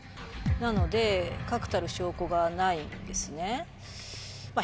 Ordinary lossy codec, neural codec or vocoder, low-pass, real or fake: none; none; none; real